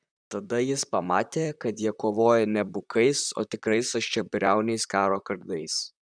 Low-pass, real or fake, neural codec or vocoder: 9.9 kHz; fake; vocoder, 44.1 kHz, 128 mel bands, Pupu-Vocoder